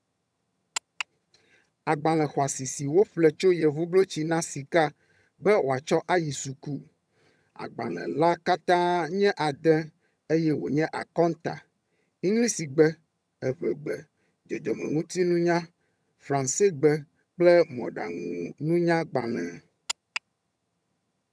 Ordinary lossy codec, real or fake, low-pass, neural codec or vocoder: none; fake; none; vocoder, 22.05 kHz, 80 mel bands, HiFi-GAN